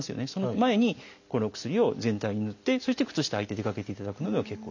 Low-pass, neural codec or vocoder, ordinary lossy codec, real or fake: 7.2 kHz; none; none; real